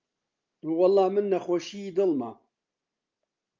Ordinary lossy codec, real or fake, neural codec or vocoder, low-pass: Opus, 24 kbps; real; none; 7.2 kHz